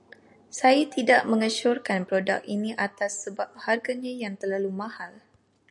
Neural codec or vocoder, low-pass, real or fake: none; 10.8 kHz; real